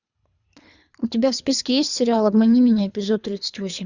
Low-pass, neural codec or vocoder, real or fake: 7.2 kHz; codec, 24 kHz, 3 kbps, HILCodec; fake